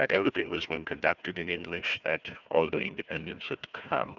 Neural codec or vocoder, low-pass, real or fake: codec, 16 kHz, 1 kbps, FunCodec, trained on Chinese and English, 50 frames a second; 7.2 kHz; fake